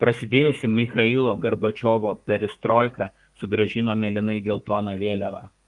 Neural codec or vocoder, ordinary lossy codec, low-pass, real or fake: codec, 32 kHz, 1.9 kbps, SNAC; Opus, 32 kbps; 10.8 kHz; fake